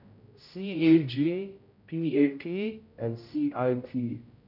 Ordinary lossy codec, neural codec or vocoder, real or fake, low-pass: none; codec, 16 kHz, 0.5 kbps, X-Codec, HuBERT features, trained on general audio; fake; 5.4 kHz